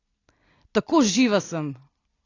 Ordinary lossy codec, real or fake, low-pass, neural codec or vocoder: AAC, 32 kbps; real; 7.2 kHz; none